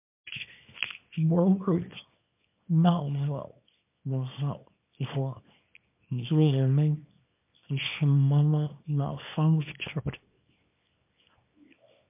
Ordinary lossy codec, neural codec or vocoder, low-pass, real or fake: MP3, 32 kbps; codec, 24 kHz, 0.9 kbps, WavTokenizer, small release; 3.6 kHz; fake